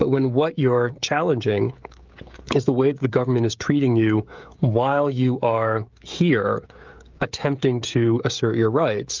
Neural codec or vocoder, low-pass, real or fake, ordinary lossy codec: codec, 16 kHz, 16 kbps, FreqCodec, smaller model; 7.2 kHz; fake; Opus, 24 kbps